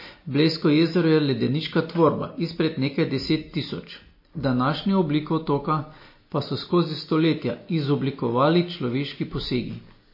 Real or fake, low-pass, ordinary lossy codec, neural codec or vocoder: real; 5.4 kHz; MP3, 24 kbps; none